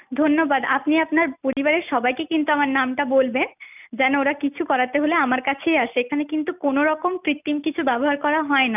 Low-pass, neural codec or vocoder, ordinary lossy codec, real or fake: 3.6 kHz; none; none; real